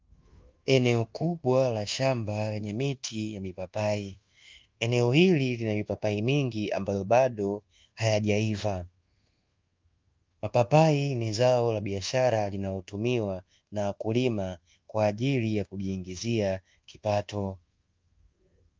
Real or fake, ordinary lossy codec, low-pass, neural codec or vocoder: fake; Opus, 32 kbps; 7.2 kHz; codec, 24 kHz, 1.2 kbps, DualCodec